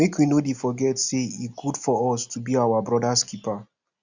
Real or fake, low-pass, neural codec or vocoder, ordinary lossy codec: real; 7.2 kHz; none; Opus, 64 kbps